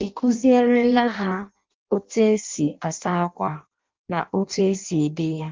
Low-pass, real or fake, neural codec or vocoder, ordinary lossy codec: 7.2 kHz; fake; codec, 16 kHz in and 24 kHz out, 0.6 kbps, FireRedTTS-2 codec; Opus, 32 kbps